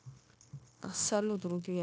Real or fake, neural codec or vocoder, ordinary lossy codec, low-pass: fake; codec, 16 kHz, 0.9 kbps, LongCat-Audio-Codec; none; none